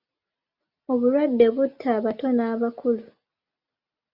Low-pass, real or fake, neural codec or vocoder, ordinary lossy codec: 5.4 kHz; real; none; Opus, 64 kbps